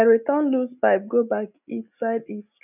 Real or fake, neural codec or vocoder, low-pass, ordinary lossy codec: real; none; 3.6 kHz; none